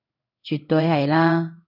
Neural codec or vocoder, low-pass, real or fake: codec, 16 kHz in and 24 kHz out, 1 kbps, XY-Tokenizer; 5.4 kHz; fake